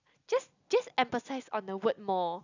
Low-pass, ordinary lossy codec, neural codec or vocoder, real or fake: 7.2 kHz; none; none; real